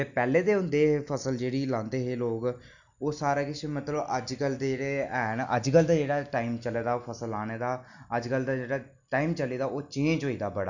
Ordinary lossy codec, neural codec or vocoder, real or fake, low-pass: none; none; real; 7.2 kHz